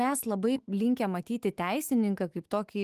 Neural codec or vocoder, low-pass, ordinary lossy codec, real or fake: autoencoder, 48 kHz, 128 numbers a frame, DAC-VAE, trained on Japanese speech; 14.4 kHz; Opus, 24 kbps; fake